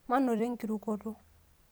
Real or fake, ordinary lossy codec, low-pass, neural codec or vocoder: fake; none; none; vocoder, 44.1 kHz, 128 mel bands every 256 samples, BigVGAN v2